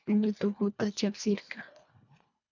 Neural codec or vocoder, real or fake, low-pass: codec, 24 kHz, 1.5 kbps, HILCodec; fake; 7.2 kHz